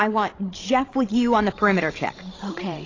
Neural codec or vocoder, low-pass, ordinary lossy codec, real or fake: codec, 16 kHz, 16 kbps, FreqCodec, larger model; 7.2 kHz; AAC, 32 kbps; fake